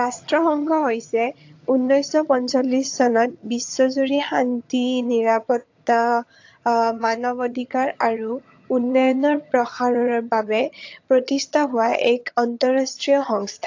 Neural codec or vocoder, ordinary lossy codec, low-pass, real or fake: vocoder, 22.05 kHz, 80 mel bands, HiFi-GAN; AAC, 48 kbps; 7.2 kHz; fake